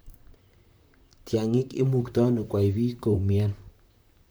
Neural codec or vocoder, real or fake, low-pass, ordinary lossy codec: vocoder, 44.1 kHz, 128 mel bands, Pupu-Vocoder; fake; none; none